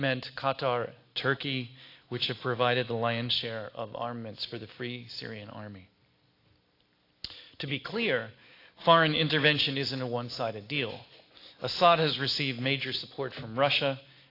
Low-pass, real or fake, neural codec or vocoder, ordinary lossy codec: 5.4 kHz; real; none; AAC, 32 kbps